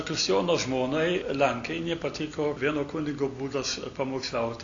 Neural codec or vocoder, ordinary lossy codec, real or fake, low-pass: none; AAC, 64 kbps; real; 7.2 kHz